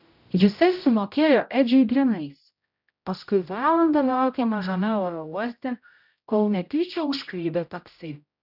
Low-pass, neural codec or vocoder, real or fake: 5.4 kHz; codec, 16 kHz, 0.5 kbps, X-Codec, HuBERT features, trained on general audio; fake